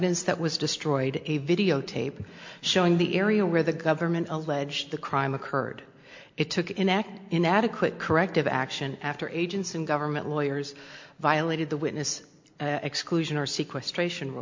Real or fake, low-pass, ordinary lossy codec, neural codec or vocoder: real; 7.2 kHz; MP3, 48 kbps; none